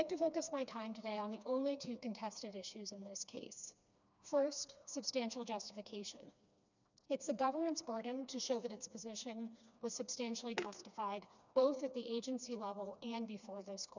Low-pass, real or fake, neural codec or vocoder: 7.2 kHz; fake; codec, 16 kHz, 2 kbps, FreqCodec, smaller model